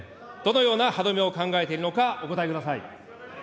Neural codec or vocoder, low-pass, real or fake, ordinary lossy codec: none; none; real; none